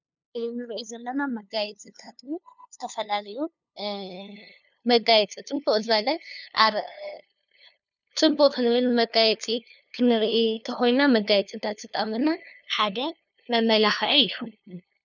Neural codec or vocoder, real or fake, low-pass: codec, 16 kHz, 2 kbps, FunCodec, trained on LibriTTS, 25 frames a second; fake; 7.2 kHz